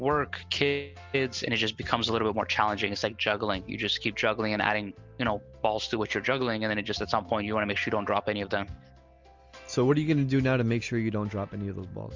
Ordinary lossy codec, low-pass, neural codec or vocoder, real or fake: Opus, 32 kbps; 7.2 kHz; none; real